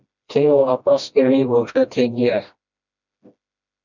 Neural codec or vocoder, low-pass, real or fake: codec, 16 kHz, 1 kbps, FreqCodec, smaller model; 7.2 kHz; fake